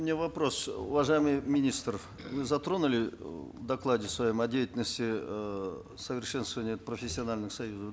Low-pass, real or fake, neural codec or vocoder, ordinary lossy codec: none; real; none; none